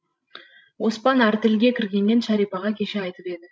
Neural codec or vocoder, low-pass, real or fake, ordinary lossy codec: codec, 16 kHz, 16 kbps, FreqCodec, larger model; none; fake; none